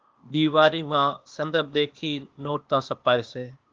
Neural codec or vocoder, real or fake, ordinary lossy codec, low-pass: codec, 16 kHz, 0.8 kbps, ZipCodec; fake; Opus, 24 kbps; 7.2 kHz